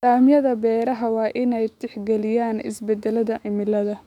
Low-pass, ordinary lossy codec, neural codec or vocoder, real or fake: 19.8 kHz; none; autoencoder, 48 kHz, 128 numbers a frame, DAC-VAE, trained on Japanese speech; fake